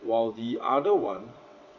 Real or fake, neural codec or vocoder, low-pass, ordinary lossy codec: fake; vocoder, 22.05 kHz, 80 mel bands, WaveNeXt; 7.2 kHz; none